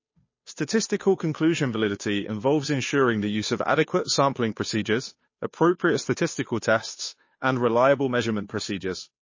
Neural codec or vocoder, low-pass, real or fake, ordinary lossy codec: codec, 16 kHz, 2 kbps, FunCodec, trained on Chinese and English, 25 frames a second; 7.2 kHz; fake; MP3, 32 kbps